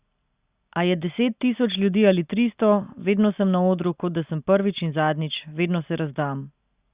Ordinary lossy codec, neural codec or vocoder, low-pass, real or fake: Opus, 64 kbps; none; 3.6 kHz; real